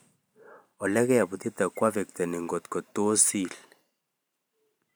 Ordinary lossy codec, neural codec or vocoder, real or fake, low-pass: none; vocoder, 44.1 kHz, 128 mel bands every 512 samples, BigVGAN v2; fake; none